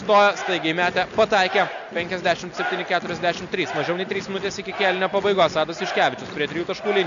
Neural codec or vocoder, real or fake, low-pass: none; real; 7.2 kHz